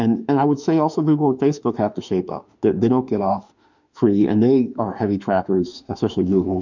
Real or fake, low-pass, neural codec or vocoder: fake; 7.2 kHz; autoencoder, 48 kHz, 32 numbers a frame, DAC-VAE, trained on Japanese speech